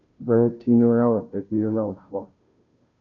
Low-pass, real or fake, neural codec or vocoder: 7.2 kHz; fake; codec, 16 kHz, 0.5 kbps, FunCodec, trained on Chinese and English, 25 frames a second